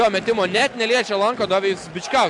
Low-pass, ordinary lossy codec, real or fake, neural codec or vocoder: 10.8 kHz; MP3, 96 kbps; real; none